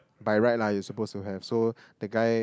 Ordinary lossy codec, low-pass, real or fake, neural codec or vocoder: none; none; real; none